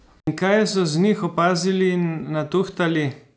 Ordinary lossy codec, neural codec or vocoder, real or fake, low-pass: none; none; real; none